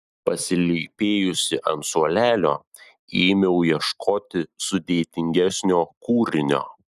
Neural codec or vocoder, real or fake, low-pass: none; real; 14.4 kHz